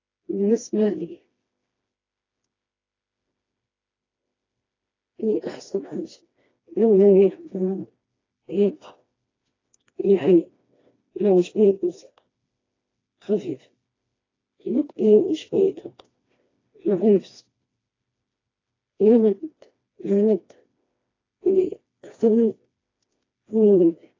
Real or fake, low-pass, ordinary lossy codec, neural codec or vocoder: fake; 7.2 kHz; AAC, 32 kbps; codec, 16 kHz, 2 kbps, FreqCodec, smaller model